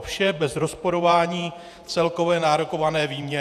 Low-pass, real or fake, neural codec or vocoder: 14.4 kHz; fake; vocoder, 48 kHz, 128 mel bands, Vocos